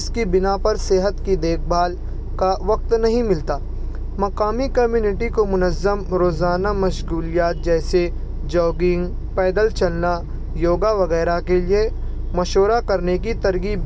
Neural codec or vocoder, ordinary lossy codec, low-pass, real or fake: none; none; none; real